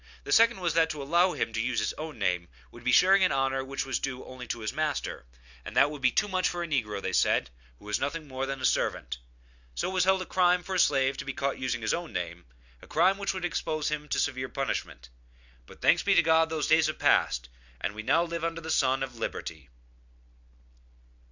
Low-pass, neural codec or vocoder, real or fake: 7.2 kHz; none; real